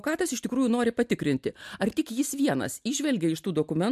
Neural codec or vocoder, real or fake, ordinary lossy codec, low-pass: none; real; MP3, 96 kbps; 14.4 kHz